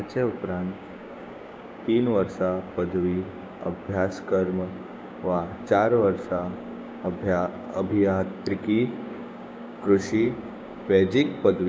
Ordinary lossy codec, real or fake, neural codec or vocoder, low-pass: none; fake; codec, 16 kHz, 6 kbps, DAC; none